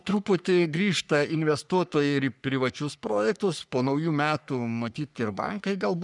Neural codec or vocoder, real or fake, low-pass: codec, 44.1 kHz, 3.4 kbps, Pupu-Codec; fake; 10.8 kHz